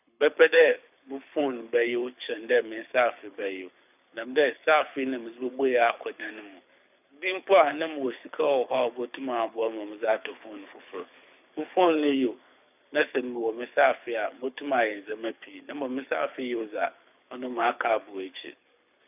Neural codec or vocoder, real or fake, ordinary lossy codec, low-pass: codec, 24 kHz, 6 kbps, HILCodec; fake; none; 3.6 kHz